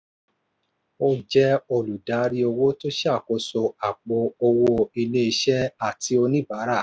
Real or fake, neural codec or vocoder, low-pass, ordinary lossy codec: real; none; none; none